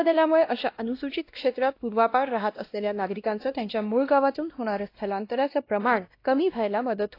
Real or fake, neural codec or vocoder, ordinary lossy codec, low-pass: fake; codec, 16 kHz, 1 kbps, X-Codec, WavLM features, trained on Multilingual LibriSpeech; AAC, 32 kbps; 5.4 kHz